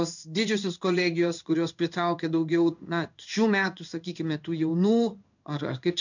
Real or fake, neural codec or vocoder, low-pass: fake; codec, 16 kHz in and 24 kHz out, 1 kbps, XY-Tokenizer; 7.2 kHz